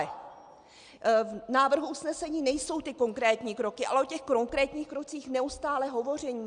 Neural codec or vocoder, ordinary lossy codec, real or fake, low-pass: none; Opus, 64 kbps; real; 9.9 kHz